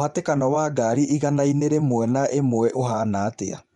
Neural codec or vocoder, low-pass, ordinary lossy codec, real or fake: vocoder, 24 kHz, 100 mel bands, Vocos; 10.8 kHz; Opus, 64 kbps; fake